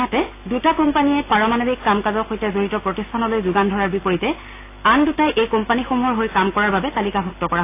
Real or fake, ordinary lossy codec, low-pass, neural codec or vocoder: real; AAC, 24 kbps; 3.6 kHz; none